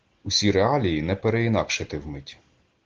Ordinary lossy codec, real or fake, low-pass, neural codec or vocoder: Opus, 16 kbps; real; 7.2 kHz; none